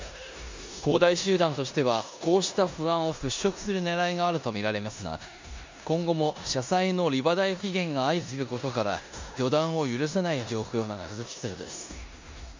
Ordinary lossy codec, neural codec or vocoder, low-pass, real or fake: MP3, 48 kbps; codec, 16 kHz in and 24 kHz out, 0.9 kbps, LongCat-Audio-Codec, four codebook decoder; 7.2 kHz; fake